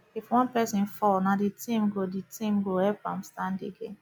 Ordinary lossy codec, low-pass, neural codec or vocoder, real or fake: none; none; none; real